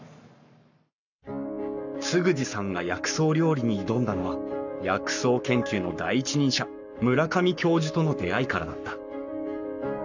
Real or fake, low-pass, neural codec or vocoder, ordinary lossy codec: fake; 7.2 kHz; codec, 44.1 kHz, 7.8 kbps, Pupu-Codec; none